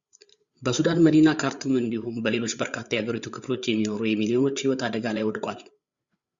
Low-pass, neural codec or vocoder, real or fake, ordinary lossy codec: 7.2 kHz; codec, 16 kHz, 8 kbps, FreqCodec, larger model; fake; Opus, 64 kbps